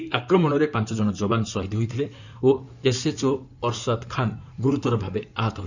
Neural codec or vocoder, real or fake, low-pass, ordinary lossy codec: codec, 16 kHz in and 24 kHz out, 2.2 kbps, FireRedTTS-2 codec; fake; 7.2 kHz; none